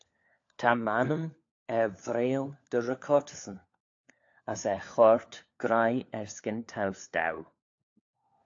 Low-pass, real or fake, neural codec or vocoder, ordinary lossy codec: 7.2 kHz; fake; codec, 16 kHz, 4 kbps, FunCodec, trained on LibriTTS, 50 frames a second; AAC, 48 kbps